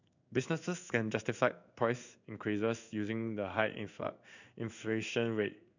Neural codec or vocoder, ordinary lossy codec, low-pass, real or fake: codec, 16 kHz in and 24 kHz out, 1 kbps, XY-Tokenizer; none; 7.2 kHz; fake